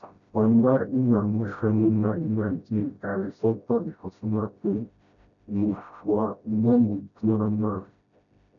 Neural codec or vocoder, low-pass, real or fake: codec, 16 kHz, 0.5 kbps, FreqCodec, smaller model; 7.2 kHz; fake